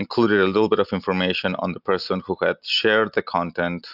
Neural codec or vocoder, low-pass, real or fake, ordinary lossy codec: none; 5.4 kHz; real; AAC, 48 kbps